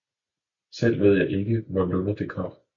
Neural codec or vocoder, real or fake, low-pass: none; real; 7.2 kHz